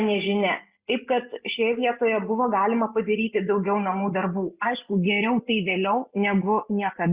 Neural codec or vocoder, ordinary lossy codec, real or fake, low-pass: none; Opus, 64 kbps; real; 3.6 kHz